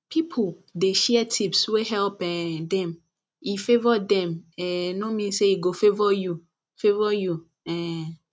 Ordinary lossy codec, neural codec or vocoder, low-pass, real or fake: none; none; none; real